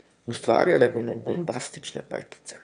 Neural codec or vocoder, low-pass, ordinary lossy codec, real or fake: autoencoder, 22.05 kHz, a latent of 192 numbers a frame, VITS, trained on one speaker; 9.9 kHz; none; fake